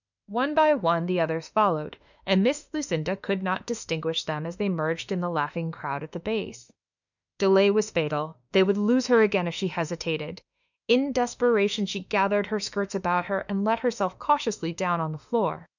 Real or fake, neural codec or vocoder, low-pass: fake; autoencoder, 48 kHz, 32 numbers a frame, DAC-VAE, trained on Japanese speech; 7.2 kHz